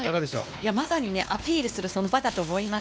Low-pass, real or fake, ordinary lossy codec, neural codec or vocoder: none; fake; none; codec, 16 kHz, 2 kbps, X-Codec, WavLM features, trained on Multilingual LibriSpeech